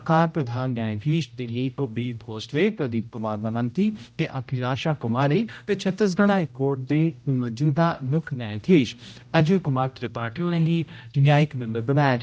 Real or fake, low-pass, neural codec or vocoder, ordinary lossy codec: fake; none; codec, 16 kHz, 0.5 kbps, X-Codec, HuBERT features, trained on general audio; none